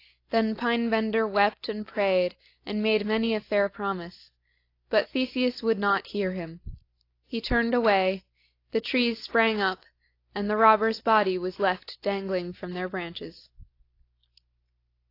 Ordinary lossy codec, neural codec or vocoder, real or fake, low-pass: AAC, 32 kbps; none; real; 5.4 kHz